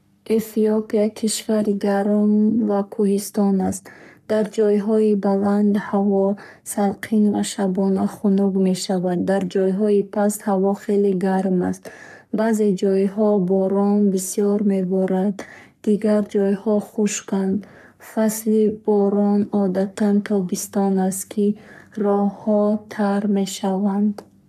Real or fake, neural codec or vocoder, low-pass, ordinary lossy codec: fake; codec, 44.1 kHz, 3.4 kbps, Pupu-Codec; 14.4 kHz; none